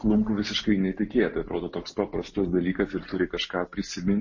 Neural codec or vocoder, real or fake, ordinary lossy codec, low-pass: none; real; MP3, 32 kbps; 7.2 kHz